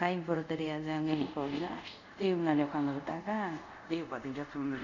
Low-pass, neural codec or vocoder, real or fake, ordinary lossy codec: 7.2 kHz; codec, 24 kHz, 0.5 kbps, DualCodec; fake; none